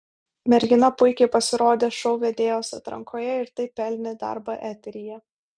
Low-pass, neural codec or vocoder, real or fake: 9.9 kHz; none; real